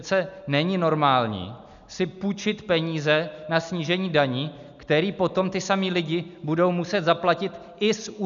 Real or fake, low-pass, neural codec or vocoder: real; 7.2 kHz; none